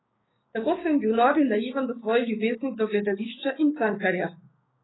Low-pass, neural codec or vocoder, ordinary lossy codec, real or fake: 7.2 kHz; codec, 16 kHz, 6 kbps, DAC; AAC, 16 kbps; fake